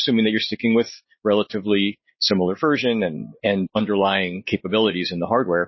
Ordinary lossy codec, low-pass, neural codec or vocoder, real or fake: MP3, 24 kbps; 7.2 kHz; none; real